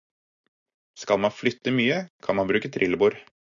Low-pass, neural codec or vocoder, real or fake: 7.2 kHz; none; real